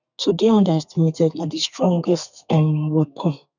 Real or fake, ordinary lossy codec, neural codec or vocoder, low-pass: fake; none; codec, 32 kHz, 1.9 kbps, SNAC; 7.2 kHz